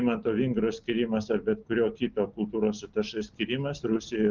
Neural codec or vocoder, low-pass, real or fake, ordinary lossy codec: none; 7.2 kHz; real; Opus, 24 kbps